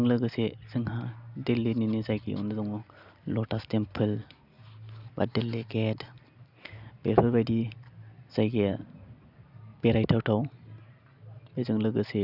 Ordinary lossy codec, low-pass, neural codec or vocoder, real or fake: none; 5.4 kHz; none; real